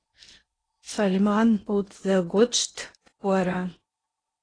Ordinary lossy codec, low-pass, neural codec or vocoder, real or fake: AAC, 32 kbps; 9.9 kHz; codec, 16 kHz in and 24 kHz out, 0.8 kbps, FocalCodec, streaming, 65536 codes; fake